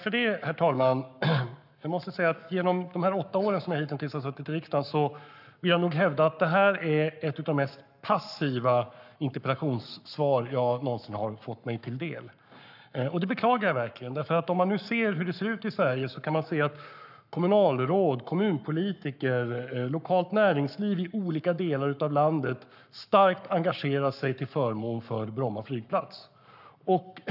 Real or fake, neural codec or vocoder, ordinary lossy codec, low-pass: fake; codec, 44.1 kHz, 7.8 kbps, Pupu-Codec; none; 5.4 kHz